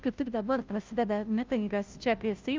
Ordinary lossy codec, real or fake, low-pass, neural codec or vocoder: Opus, 24 kbps; fake; 7.2 kHz; codec, 16 kHz, 0.5 kbps, FunCodec, trained on Chinese and English, 25 frames a second